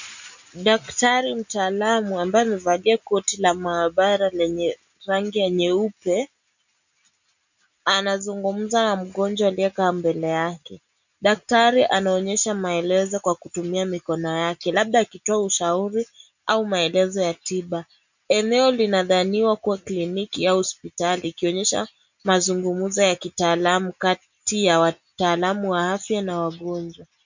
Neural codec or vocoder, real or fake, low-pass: none; real; 7.2 kHz